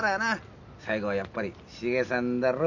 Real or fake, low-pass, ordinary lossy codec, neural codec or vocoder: real; 7.2 kHz; none; none